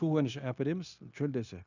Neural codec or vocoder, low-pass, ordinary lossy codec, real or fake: codec, 16 kHz, 0.9 kbps, LongCat-Audio-Codec; 7.2 kHz; none; fake